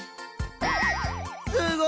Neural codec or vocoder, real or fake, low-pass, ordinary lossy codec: none; real; none; none